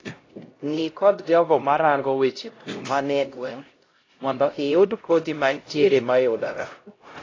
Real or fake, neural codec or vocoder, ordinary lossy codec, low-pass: fake; codec, 16 kHz, 0.5 kbps, X-Codec, HuBERT features, trained on LibriSpeech; AAC, 32 kbps; 7.2 kHz